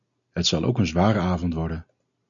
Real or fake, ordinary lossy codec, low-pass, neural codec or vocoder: real; MP3, 96 kbps; 7.2 kHz; none